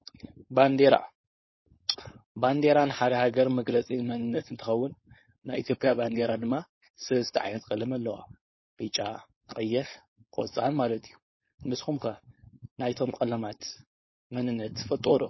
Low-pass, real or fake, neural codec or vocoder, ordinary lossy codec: 7.2 kHz; fake; codec, 16 kHz, 4.8 kbps, FACodec; MP3, 24 kbps